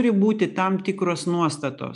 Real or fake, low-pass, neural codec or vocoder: real; 10.8 kHz; none